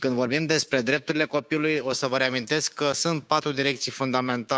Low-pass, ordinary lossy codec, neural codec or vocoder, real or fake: none; none; codec, 16 kHz, 6 kbps, DAC; fake